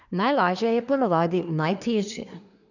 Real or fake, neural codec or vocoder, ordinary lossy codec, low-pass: fake; codec, 24 kHz, 1 kbps, SNAC; none; 7.2 kHz